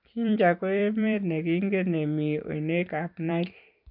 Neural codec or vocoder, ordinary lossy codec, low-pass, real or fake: vocoder, 44.1 kHz, 128 mel bands every 256 samples, BigVGAN v2; none; 5.4 kHz; fake